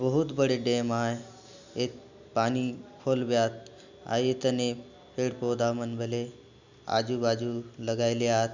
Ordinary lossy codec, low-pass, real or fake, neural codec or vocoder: none; 7.2 kHz; real; none